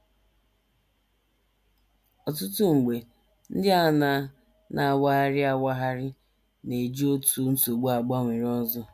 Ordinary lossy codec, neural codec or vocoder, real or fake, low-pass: none; none; real; 14.4 kHz